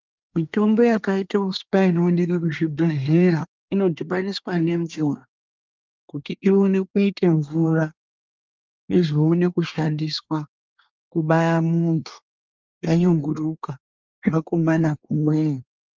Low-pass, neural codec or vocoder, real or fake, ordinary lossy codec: 7.2 kHz; codec, 24 kHz, 1 kbps, SNAC; fake; Opus, 32 kbps